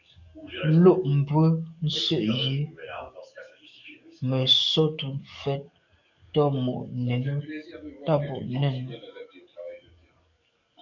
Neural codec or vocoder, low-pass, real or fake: codec, 44.1 kHz, 7.8 kbps, DAC; 7.2 kHz; fake